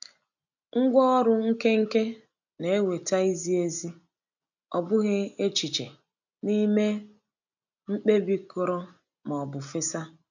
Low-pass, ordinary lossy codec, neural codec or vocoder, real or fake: 7.2 kHz; none; none; real